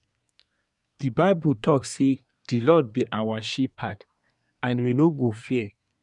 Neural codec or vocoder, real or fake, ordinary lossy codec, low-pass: codec, 24 kHz, 1 kbps, SNAC; fake; none; 10.8 kHz